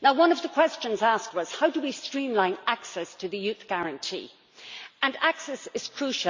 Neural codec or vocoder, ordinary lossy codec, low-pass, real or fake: none; MP3, 64 kbps; 7.2 kHz; real